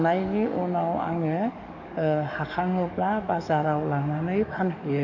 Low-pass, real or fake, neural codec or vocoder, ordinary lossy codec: 7.2 kHz; fake; codec, 44.1 kHz, 7.8 kbps, DAC; none